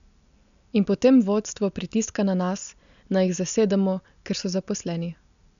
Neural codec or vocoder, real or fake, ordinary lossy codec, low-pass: none; real; none; 7.2 kHz